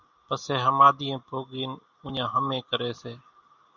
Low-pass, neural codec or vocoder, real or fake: 7.2 kHz; none; real